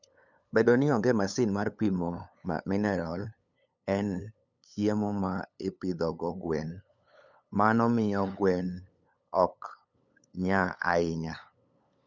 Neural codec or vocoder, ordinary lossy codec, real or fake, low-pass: codec, 16 kHz, 8 kbps, FunCodec, trained on LibriTTS, 25 frames a second; none; fake; 7.2 kHz